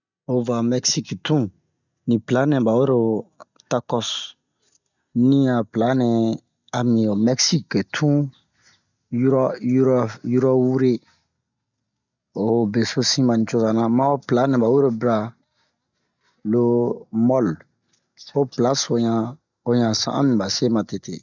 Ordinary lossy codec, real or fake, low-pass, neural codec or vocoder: none; real; 7.2 kHz; none